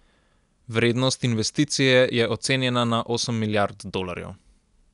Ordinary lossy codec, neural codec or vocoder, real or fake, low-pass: none; none; real; 10.8 kHz